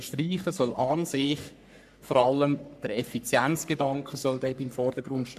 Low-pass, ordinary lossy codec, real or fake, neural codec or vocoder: 14.4 kHz; none; fake; codec, 44.1 kHz, 3.4 kbps, Pupu-Codec